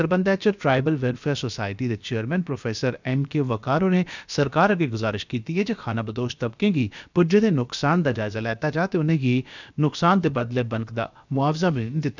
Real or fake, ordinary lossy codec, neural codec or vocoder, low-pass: fake; none; codec, 16 kHz, about 1 kbps, DyCAST, with the encoder's durations; 7.2 kHz